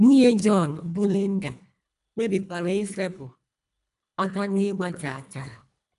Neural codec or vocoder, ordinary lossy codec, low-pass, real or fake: codec, 24 kHz, 1.5 kbps, HILCodec; none; 10.8 kHz; fake